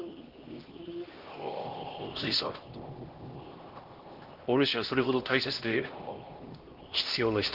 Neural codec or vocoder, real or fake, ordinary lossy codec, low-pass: codec, 16 kHz, 1 kbps, X-Codec, HuBERT features, trained on LibriSpeech; fake; Opus, 16 kbps; 5.4 kHz